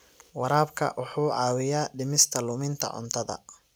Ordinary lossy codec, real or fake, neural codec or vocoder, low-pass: none; real; none; none